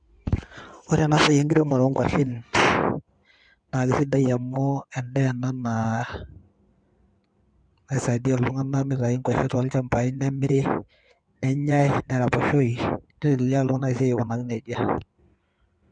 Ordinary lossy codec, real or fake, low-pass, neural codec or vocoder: none; fake; 9.9 kHz; codec, 16 kHz in and 24 kHz out, 2.2 kbps, FireRedTTS-2 codec